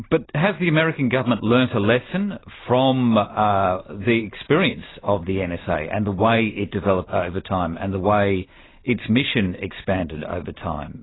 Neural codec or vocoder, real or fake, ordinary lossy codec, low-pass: none; real; AAC, 16 kbps; 7.2 kHz